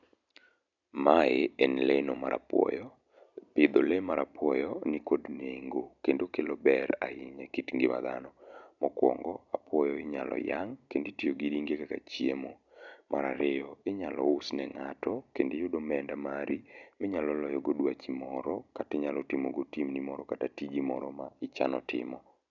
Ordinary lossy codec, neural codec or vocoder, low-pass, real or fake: none; none; 7.2 kHz; real